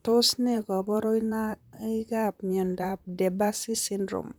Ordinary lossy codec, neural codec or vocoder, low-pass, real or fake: none; vocoder, 44.1 kHz, 128 mel bands, Pupu-Vocoder; none; fake